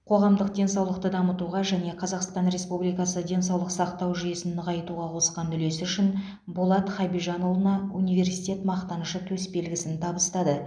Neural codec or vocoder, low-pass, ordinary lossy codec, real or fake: none; 9.9 kHz; none; real